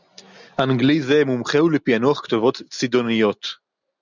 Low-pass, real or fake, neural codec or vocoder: 7.2 kHz; real; none